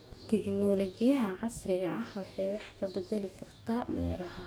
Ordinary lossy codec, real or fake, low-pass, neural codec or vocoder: none; fake; none; codec, 44.1 kHz, 2.6 kbps, DAC